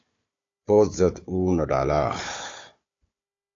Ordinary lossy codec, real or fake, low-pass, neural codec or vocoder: AAC, 48 kbps; fake; 7.2 kHz; codec, 16 kHz, 4 kbps, FunCodec, trained on Chinese and English, 50 frames a second